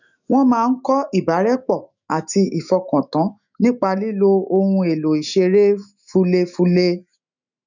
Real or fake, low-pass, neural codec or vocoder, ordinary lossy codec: fake; 7.2 kHz; autoencoder, 48 kHz, 128 numbers a frame, DAC-VAE, trained on Japanese speech; none